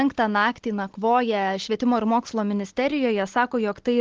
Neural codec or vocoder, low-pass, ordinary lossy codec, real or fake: none; 7.2 kHz; Opus, 16 kbps; real